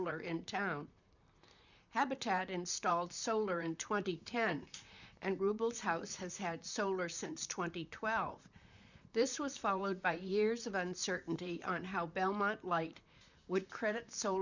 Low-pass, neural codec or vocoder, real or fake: 7.2 kHz; vocoder, 44.1 kHz, 128 mel bands, Pupu-Vocoder; fake